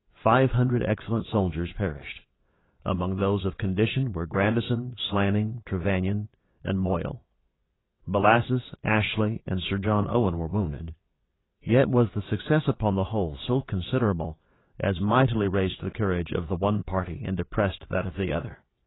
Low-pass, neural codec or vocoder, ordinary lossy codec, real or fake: 7.2 kHz; vocoder, 22.05 kHz, 80 mel bands, WaveNeXt; AAC, 16 kbps; fake